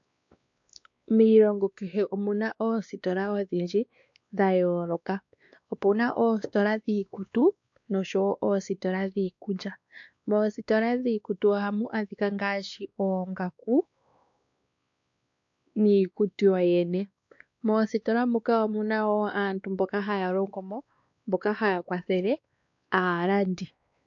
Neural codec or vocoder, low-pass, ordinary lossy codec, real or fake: codec, 16 kHz, 2 kbps, X-Codec, WavLM features, trained on Multilingual LibriSpeech; 7.2 kHz; AAC, 64 kbps; fake